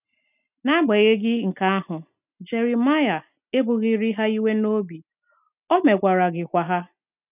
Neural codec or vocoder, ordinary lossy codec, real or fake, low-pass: none; none; real; 3.6 kHz